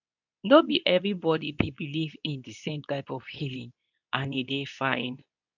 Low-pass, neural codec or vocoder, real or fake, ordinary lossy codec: 7.2 kHz; codec, 24 kHz, 0.9 kbps, WavTokenizer, medium speech release version 2; fake; none